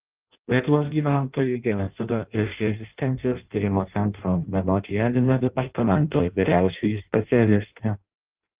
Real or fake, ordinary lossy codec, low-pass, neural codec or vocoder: fake; Opus, 32 kbps; 3.6 kHz; codec, 16 kHz in and 24 kHz out, 0.6 kbps, FireRedTTS-2 codec